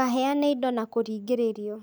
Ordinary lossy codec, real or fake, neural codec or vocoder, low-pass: none; real; none; none